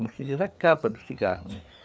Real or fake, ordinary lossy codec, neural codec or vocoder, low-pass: fake; none; codec, 16 kHz, 4 kbps, FreqCodec, larger model; none